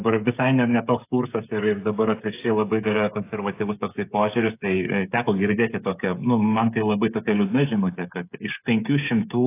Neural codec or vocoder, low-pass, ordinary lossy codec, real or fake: codec, 16 kHz, 16 kbps, FreqCodec, smaller model; 3.6 kHz; AAC, 24 kbps; fake